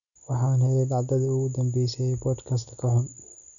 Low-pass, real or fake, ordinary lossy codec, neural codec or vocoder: 7.2 kHz; real; none; none